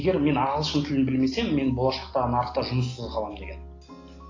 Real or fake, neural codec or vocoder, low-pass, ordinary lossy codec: real; none; 7.2 kHz; AAC, 32 kbps